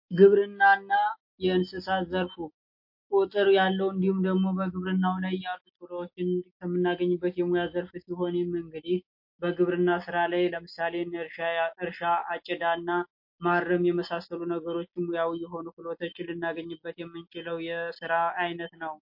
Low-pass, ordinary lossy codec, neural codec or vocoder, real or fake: 5.4 kHz; MP3, 32 kbps; none; real